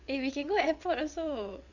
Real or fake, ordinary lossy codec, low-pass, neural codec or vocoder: fake; none; 7.2 kHz; vocoder, 22.05 kHz, 80 mel bands, WaveNeXt